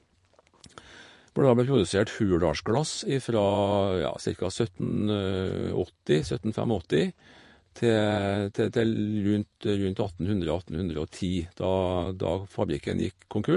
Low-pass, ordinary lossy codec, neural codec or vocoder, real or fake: 10.8 kHz; MP3, 48 kbps; vocoder, 24 kHz, 100 mel bands, Vocos; fake